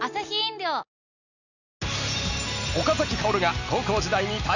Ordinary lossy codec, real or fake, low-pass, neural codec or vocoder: none; real; 7.2 kHz; none